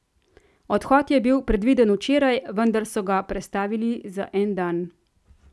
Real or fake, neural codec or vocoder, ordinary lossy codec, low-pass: real; none; none; none